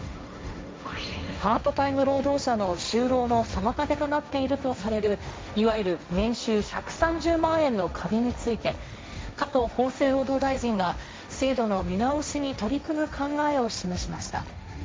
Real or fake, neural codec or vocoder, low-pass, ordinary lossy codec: fake; codec, 16 kHz, 1.1 kbps, Voila-Tokenizer; none; none